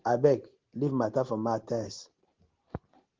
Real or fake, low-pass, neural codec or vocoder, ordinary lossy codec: real; 7.2 kHz; none; Opus, 16 kbps